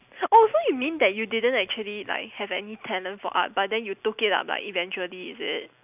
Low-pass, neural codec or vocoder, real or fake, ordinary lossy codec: 3.6 kHz; none; real; none